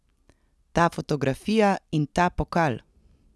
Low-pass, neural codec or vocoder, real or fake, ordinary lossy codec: none; none; real; none